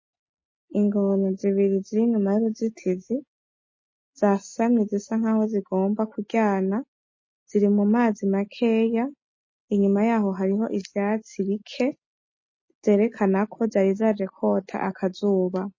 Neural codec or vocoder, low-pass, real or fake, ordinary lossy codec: none; 7.2 kHz; real; MP3, 32 kbps